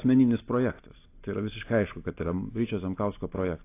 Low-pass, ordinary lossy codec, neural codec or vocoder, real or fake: 3.6 kHz; AAC, 24 kbps; none; real